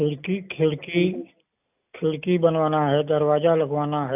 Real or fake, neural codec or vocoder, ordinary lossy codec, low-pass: real; none; AAC, 32 kbps; 3.6 kHz